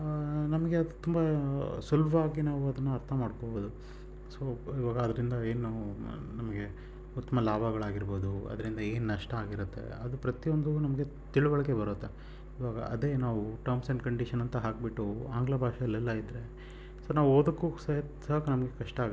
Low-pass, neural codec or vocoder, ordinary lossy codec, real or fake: none; none; none; real